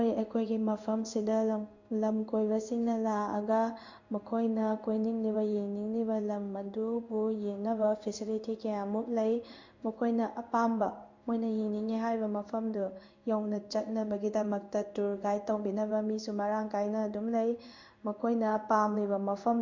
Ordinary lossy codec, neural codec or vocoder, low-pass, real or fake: MP3, 48 kbps; codec, 16 kHz in and 24 kHz out, 1 kbps, XY-Tokenizer; 7.2 kHz; fake